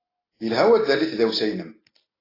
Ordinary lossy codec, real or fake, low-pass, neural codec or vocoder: AAC, 24 kbps; real; 5.4 kHz; none